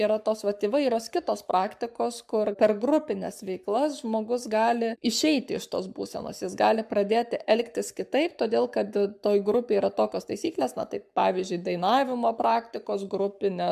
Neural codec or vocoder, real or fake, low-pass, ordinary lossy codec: codec, 44.1 kHz, 7.8 kbps, Pupu-Codec; fake; 14.4 kHz; MP3, 96 kbps